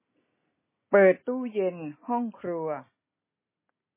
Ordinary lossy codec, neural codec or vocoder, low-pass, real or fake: MP3, 16 kbps; none; 3.6 kHz; real